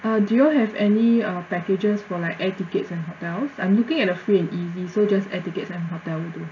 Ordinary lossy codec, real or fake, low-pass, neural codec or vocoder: none; real; 7.2 kHz; none